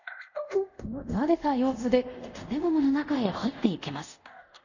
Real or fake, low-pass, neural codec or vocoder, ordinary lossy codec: fake; 7.2 kHz; codec, 24 kHz, 0.5 kbps, DualCodec; none